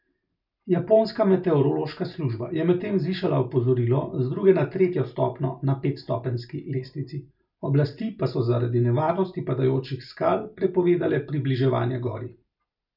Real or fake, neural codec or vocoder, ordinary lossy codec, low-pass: real; none; none; 5.4 kHz